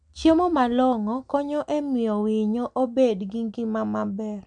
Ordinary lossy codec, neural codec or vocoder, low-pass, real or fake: MP3, 96 kbps; none; 9.9 kHz; real